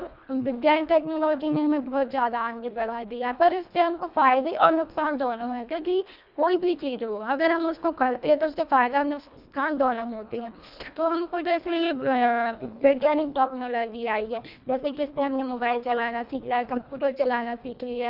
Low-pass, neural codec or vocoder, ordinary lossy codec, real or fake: 5.4 kHz; codec, 24 kHz, 1.5 kbps, HILCodec; none; fake